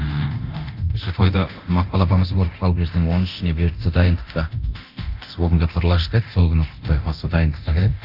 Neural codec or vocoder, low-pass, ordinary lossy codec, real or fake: codec, 24 kHz, 0.9 kbps, DualCodec; 5.4 kHz; none; fake